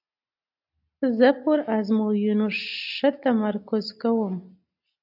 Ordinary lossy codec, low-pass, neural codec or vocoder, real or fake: AAC, 48 kbps; 5.4 kHz; none; real